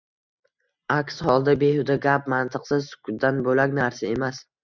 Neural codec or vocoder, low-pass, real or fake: none; 7.2 kHz; real